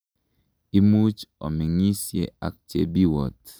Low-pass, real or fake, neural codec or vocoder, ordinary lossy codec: none; real; none; none